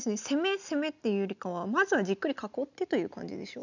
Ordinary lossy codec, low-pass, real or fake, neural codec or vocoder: none; 7.2 kHz; real; none